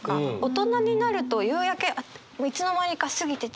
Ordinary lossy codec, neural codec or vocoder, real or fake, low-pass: none; none; real; none